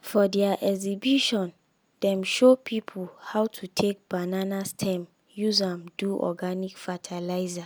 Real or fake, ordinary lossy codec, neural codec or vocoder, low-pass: real; none; none; none